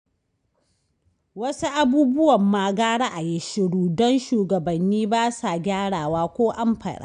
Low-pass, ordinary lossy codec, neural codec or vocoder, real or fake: 9.9 kHz; none; none; real